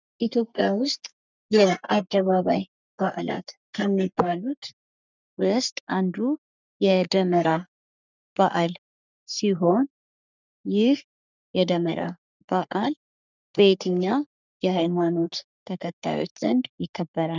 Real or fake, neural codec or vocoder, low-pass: fake; codec, 44.1 kHz, 3.4 kbps, Pupu-Codec; 7.2 kHz